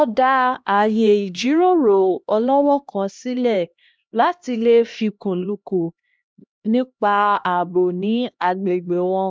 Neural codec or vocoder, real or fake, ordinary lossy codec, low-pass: codec, 16 kHz, 1 kbps, X-Codec, HuBERT features, trained on LibriSpeech; fake; none; none